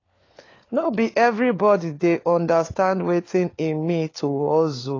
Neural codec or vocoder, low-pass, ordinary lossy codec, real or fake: codec, 16 kHz, 4 kbps, FunCodec, trained on LibriTTS, 50 frames a second; 7.2 kHz; AAC, 32 kbps; fake